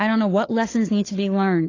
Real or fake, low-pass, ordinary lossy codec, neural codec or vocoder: fake; 7.2 kHz; AAC, 32 kbps; codec, 16 kHz, 4 kbps, FunCodec, trained on LibriTTS, 50 frames a second